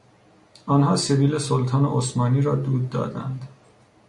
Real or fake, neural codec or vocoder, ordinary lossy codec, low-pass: real; none; MP3, 64 kbps; 10.8 kHz